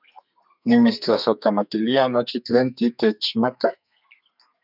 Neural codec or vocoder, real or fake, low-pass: codec, 32 kHz, 1.9 kbps, SNAC; fake; 5.4 kHz